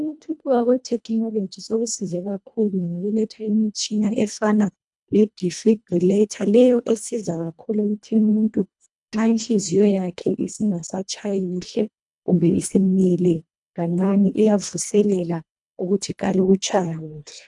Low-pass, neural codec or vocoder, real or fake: 10.8 kHz; codec, 24 kHz, 1.5 kbps, HILCodec; fake